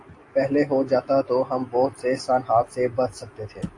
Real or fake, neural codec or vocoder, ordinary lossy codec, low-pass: real; none; AAC, 48 kbps; 10.8 kHz